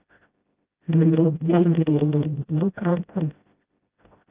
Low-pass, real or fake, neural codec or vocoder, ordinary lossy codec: 3.6 kHz; fake; codec, 16 kHz, 0.5 kbps, FreqCodec, smaller model; Opus, 32 kbps